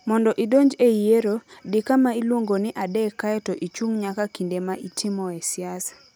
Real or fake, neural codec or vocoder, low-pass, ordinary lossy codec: real; none; none; none